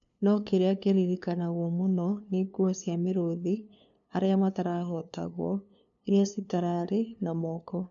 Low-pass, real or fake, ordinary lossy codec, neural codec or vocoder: 7.2 kHz; fake; none; codec, 16 kHz, 2 kbps, FunCodec, trained on LibriTTS, 25 frames a second